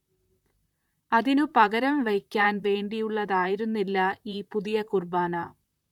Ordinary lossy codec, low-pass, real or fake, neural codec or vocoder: none; 19.8 kHz; fake; vocoder, 44.1 kHz, 128 mel bands, Pupu-Vocoder